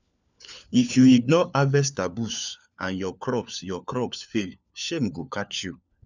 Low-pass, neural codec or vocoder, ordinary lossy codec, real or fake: 7.2 kHz; codec, 16 kHz, 4 kbps, FunCodec, trained on LibriTTS, 50 frames a second; none; fake